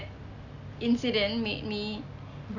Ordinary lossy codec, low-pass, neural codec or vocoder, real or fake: none; 7.2 kHz; none; real